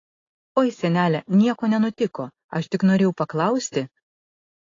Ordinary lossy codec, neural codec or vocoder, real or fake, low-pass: AAC, 32 kbps; none; real; 7.2 kHz